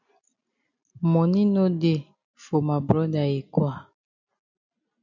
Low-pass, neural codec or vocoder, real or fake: 7.2 kHz; none; real